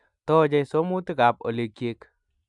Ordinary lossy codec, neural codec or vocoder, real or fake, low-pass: none; none; real; 10.8 kHz